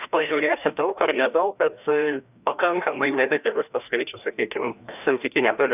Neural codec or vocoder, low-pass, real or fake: codec, 16 kHz, 1 kbps, FreqCodec, larger model; 3.6 kHz; fake